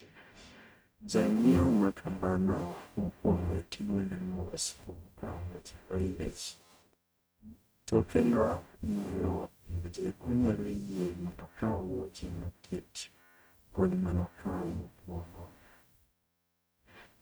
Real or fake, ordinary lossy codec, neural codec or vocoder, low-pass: fake; none; codec, 44.1 kHz, 0.9 kbps, DAC; none